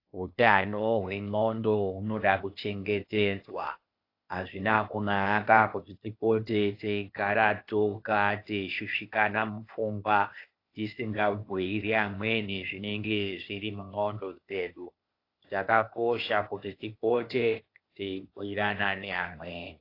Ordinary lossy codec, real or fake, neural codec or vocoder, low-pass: AAC, 32 kbps; fake; codec, 16 kHz, 0.8 kbps, ZipCodec; 5.4 kHz